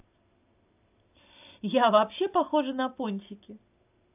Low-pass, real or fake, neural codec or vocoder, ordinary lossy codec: 3.6 kHz; real; none; AAC, 32 kbps